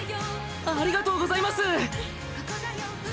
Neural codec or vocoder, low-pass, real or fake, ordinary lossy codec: none; none; real; none